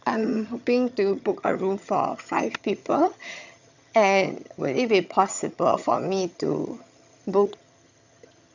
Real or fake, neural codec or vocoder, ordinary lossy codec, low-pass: fake; vocoder, 22.05 kHz, 80 mel bands, HiFi-GAN; none; 7.2 kHz